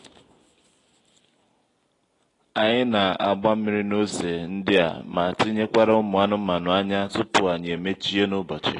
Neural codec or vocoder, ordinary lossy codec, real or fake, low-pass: none; AAC, 32 kbps; real; 10.8 kHz